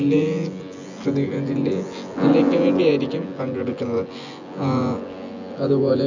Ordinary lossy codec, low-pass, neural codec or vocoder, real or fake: none; 7.2 kHz; vocoder, 24 kHz, 100 mel bands, Vocos; fake